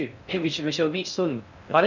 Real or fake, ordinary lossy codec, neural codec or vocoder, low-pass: fake; none; codec, 16 kHz in and 24 kHz out, 0.6 kbps, FocalCodec, streaming, 4096 codes; 7.2 kHz